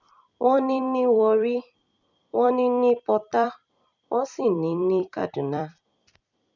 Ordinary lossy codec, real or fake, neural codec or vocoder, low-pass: none; fake; vocoder, 44.1 kHz, 128 mel bands every 256 samples, BigVGAN v2; 7.2 kHz